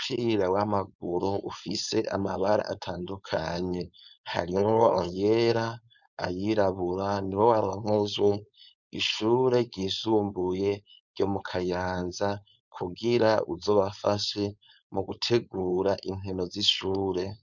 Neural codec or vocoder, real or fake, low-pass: codec, 16 kHz, 4.8 kbps, FACodec; fake; 7.2 kHz